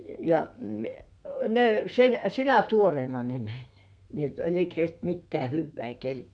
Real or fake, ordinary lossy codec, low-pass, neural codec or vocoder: fake; none; 9.9 kHz; codec, 32 kHz, 1.9 kbps, SNAC